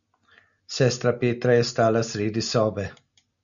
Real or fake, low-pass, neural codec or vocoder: real; 7.2 kHz; none